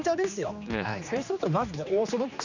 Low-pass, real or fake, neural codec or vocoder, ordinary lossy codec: 7.2 kHz; fake; codec, 16 kHz, 2 kbps, X-Codec, HuBERT features, trained on general audio; none